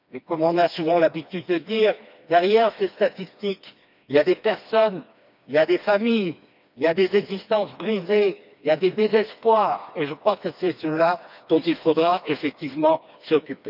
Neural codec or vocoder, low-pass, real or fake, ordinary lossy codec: codec, 16 kHz, 2 kbps, FreqCodec, smaller model; 5.4 kHz; fake; none